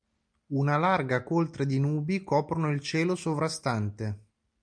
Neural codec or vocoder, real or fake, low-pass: none; real; 9.9 kHz